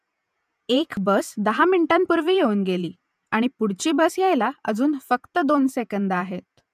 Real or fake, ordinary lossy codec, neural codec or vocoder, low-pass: fake; none; vocoder, 48 kHz, 128 mel bands, Vocos; 14.4 kHz